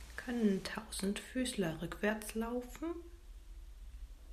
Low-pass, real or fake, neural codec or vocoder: 14.4 kHz; real; none